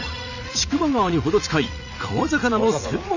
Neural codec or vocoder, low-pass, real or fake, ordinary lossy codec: vocoder, 44.1 kHz, 80 mel bands, Vocos; 7.2 kHz; fake; none